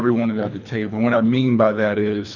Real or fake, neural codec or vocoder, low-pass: fake; codec, 24 kHz, 3 kbps, HILCodec; 7.2 kHz